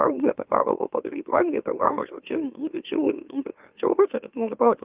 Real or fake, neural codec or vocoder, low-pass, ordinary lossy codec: fake; autoencoder, 44.1 kHz, a latent of 192 numbers a frame, MeloTTS; 3.6 kHz; Opus, 32 kbps